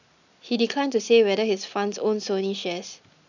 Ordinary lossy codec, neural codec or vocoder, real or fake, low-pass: none; none; real; 7.2 kHz